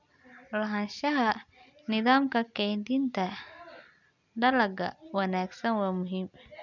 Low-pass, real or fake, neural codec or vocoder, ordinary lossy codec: 7.2 kHz; real; none; none